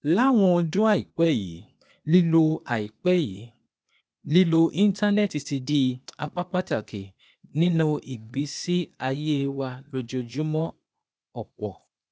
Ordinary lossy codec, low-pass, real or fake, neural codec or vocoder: none; none; fake; codec, 16 kHz, 0.8 kbps, ZipCodec